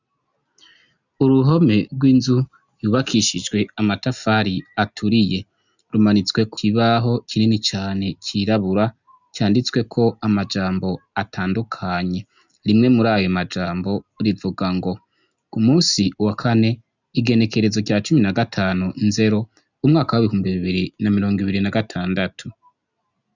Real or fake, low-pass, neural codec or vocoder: real; 7.2 kHz; none